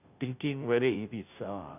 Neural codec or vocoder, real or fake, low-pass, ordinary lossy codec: codec, 16 kHz, 0.5 kbps, FunCodec, trained on Chinese and English, 25 frames a second; fake; 3.6 kHz; none